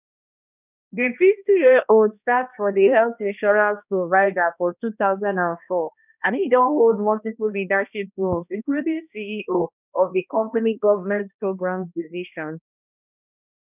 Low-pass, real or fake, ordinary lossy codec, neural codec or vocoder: 3.6 kHz; fake; none; codec, 16 kHz, 1 kbps, X-Codec, HuBERT features, trained on balanced general audio